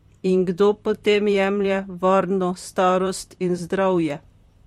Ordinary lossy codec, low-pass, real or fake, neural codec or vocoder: MP3, 64 kbps; 19.8 kHz; fake; vocoder, 44.1 kHz, 128 mel bands, Pupu-Vocoder